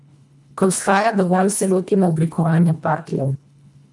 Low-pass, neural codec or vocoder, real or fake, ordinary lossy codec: none; codec, 24 kHz, 1.5 kbps, HILCodec; fake; none